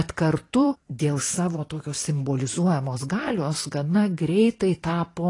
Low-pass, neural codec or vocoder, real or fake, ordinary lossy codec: 10.8 kHz; vocoder, 44.1 kHz, 128 mel bands every 512 samples, BigVGAN v2; fake; AAC, 32 kbps